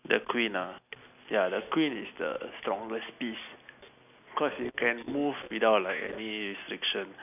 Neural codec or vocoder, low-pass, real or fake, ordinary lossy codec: none; 3.6 kHz; real; none